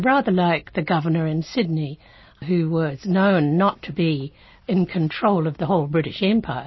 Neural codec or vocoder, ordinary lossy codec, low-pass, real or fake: none; MP3, 24 kbps; 7.2 kHz; real